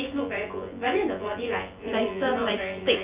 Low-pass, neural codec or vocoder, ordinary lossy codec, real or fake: 3.6 kHz; vocoder, 24 kHz, 100 mel bands, Vocos; Opus, 24 kbps; fake